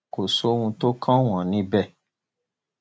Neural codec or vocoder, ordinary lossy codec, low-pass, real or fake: none; none; none; real